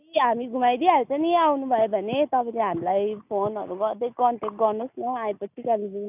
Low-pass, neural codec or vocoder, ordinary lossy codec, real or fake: 3.6 kHz; none; none; real